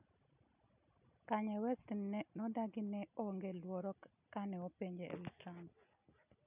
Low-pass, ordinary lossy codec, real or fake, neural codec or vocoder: 3.6 kHz; none; real; none